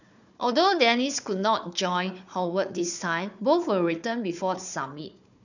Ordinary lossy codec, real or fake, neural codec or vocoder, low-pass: none; fake; codec, 16 kHz, 4 kbps, FunCodec, trained on Chinese and English, 50 frames a second; 7.2 kHz